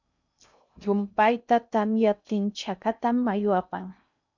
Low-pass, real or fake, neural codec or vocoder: 7.2 kHz; fake; codec, 16 kHz in and 24 kHz out, 0.6 kbps, FocalCodec, streaming, 2048 codes